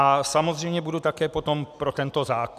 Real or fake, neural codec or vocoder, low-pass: real; none; 14.4 kHz